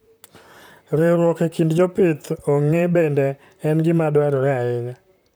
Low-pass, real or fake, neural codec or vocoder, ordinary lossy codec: none; fake; vocoder, 44.1 kHz, 128 mel bands, Pupu-Vocoder; none